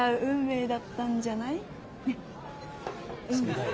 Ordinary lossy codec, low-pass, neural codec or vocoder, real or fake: none; none; none; real